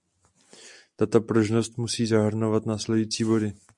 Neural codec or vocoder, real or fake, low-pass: none; real; 10.8 kHz